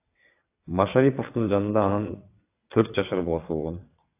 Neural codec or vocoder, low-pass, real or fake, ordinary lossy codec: vocoder, 22.05 kHz, 80 mel bands, WaveNeXt; 3.6 kHz; fake; AAC, 24 kbps